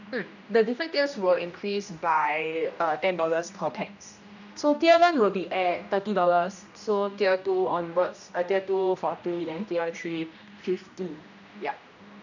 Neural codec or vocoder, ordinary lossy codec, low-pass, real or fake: codec, 16 kHz, 1 kbps, X-Codec, HuBERT features, trained on general audio; none; 7.2 kHz; fake